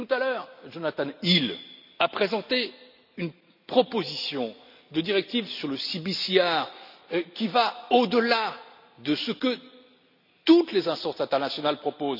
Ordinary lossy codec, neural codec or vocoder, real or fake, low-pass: none; none; real; 5.4 kHz